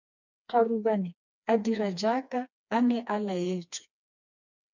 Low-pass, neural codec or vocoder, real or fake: 7.2 kHz; codec, 16 kHz, 2 kbps, FreqCodec, smaller model; fake